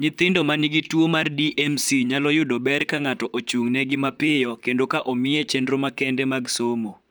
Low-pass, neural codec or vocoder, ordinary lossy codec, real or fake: none; vocoder, 44.1 kHz, 128 mel bands, Pupu-Vocoder; none; fake